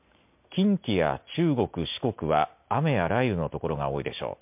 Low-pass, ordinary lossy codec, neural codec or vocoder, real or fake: 3.6 kHz; MP3, 32 kbps; none; real